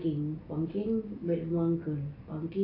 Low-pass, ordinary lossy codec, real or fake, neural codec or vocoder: 5.4 kHz; AAC, 24 kbps; real; none